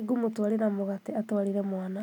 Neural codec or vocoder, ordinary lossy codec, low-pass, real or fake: none; none; 19.8 kHz; real